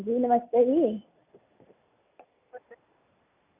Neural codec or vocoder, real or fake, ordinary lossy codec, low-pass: none; real; none; 3.6 kHz